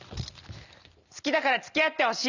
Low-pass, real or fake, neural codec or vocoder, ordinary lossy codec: 7.2 kHz; real; none; none